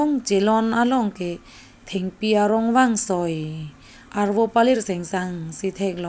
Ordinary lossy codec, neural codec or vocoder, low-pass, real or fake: none; none; none; real